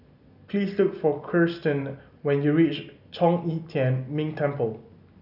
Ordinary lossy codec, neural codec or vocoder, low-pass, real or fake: none; none; 5.4 kHz; real